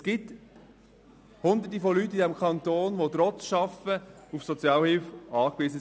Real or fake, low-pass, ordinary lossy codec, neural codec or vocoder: real; none; none; none